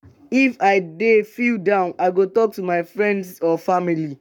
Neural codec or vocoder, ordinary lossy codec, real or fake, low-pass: codec, 44.1 kHz, 7.8 kbps, Pupu-Codec; none; fake; 19.8 kHz